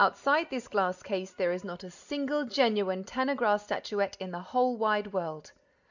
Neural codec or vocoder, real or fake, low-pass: none; real; 7.2 kHz